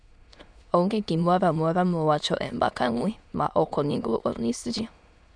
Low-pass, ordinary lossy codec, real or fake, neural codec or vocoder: 9.9 kHz; none; fake; autoencoder, 22.05 kHz, a latent of 192 numbers a frame, VITS, trained on many speakers